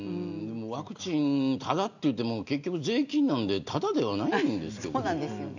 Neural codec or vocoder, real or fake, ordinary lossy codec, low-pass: none; real; none; 7.2 kHz